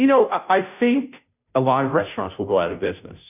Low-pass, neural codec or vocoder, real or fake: 3.6 kHz; codec, 16 kHz, 0.5 kbps, FunCodec, trained on Chinese and English, 25 frames a second; fake